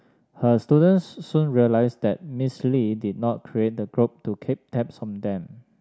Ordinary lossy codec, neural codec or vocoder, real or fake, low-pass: none; none; real; none